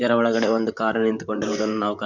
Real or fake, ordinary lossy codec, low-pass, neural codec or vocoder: fake; none; 7.2 kHz; vocoder, 44.1 kHz, 128 mel bands, Pupu-Vocoder